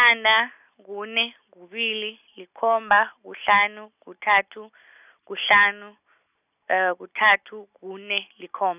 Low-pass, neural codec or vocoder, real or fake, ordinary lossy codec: 3.6 kHz; none; real; none